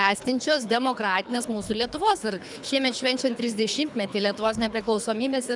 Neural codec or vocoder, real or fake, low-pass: codec, 24 kHz, 3 kbps, HILCodec; fake; 10.8 kHz